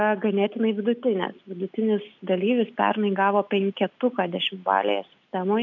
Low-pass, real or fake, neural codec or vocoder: 7.2 kHz; real; none